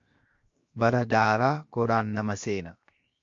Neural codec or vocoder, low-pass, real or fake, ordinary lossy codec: codec, 16 kHz, 0.7 kbps, FocalCodec; 7.2 kHz; fake; MP3, 48 kbps